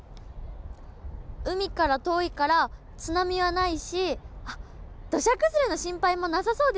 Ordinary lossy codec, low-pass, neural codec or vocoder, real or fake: none; none; none; real